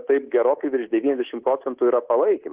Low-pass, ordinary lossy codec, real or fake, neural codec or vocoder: 3.6 kHz; Opus, 16 kbps; fake; codec, 24 kHz, 3.1 kbps, DualCodec